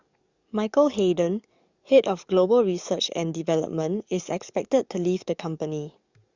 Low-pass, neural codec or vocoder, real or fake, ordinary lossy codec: 7.2 kHz; codec, 44.1 kHz, 7.8 kbps, DAC; fake; Opus, 64 kbps